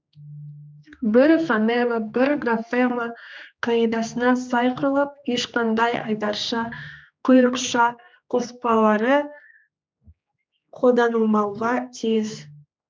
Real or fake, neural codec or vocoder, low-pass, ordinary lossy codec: fake; codec, 16 kHz, 2 kbps, X-Codec, HuBERT features, trained on general audio; none; none